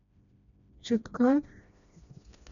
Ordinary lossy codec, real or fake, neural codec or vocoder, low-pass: none; fake; codec, 16 kHz, 1 kbps, FreqCodec, smaller model; 7.2 kHz